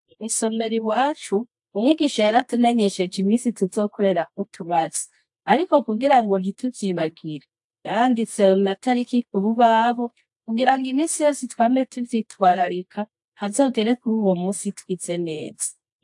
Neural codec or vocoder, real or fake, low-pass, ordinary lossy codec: codec, 24 kHz, 0.9 kbps, WavTokenizer, medium music audio release; fake; 10.8 kHz; AAC, 64 kbps